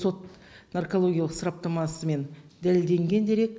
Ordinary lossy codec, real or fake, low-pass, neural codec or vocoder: none; real; none; none